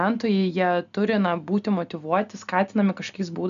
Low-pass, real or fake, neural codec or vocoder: 7.2 kHz; real; none